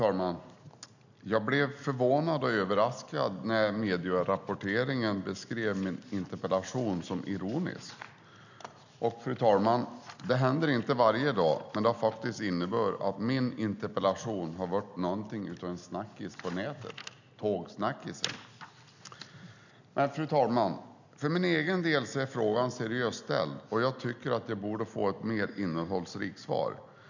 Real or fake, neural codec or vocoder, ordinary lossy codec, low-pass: real; none; none; 7.2 kHz